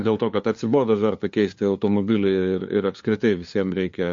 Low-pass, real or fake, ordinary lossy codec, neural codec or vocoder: 7.2 kHz; fake; MP3, 48 kbps; codec, 16 kHz, 2 kbps, FunCodec, trained on LibriTTS, 25 frames a second